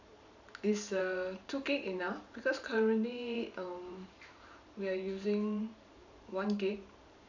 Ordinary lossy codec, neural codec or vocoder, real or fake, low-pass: none; vocoder, 44.1 kHz, 128 mel bands every 512 samples, BigVGAN v2; fake; 7.2 kHz